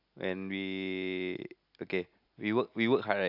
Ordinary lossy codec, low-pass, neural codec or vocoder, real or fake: AAC, 48 kbps; 5.4 kHz; none; real